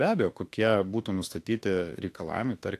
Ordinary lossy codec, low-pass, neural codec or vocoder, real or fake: AAC, 64 kbps; 14.4 kHz; autoencoder, 48 kHz, 32 numbers a frame, DAC-VAE, trained on Japanese speech; fake